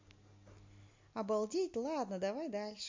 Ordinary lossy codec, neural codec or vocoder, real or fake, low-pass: MP3, 48 kbps; none; real; 7.2 kHz